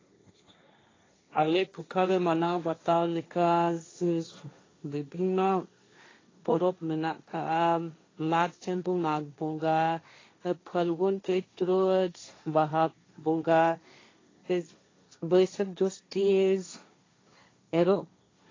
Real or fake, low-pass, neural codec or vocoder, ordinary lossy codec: fake; 7.2 kHz; codec, 16 kHz, 1.1 kbps, Voila-Tokenizer; AAC, 32 kbps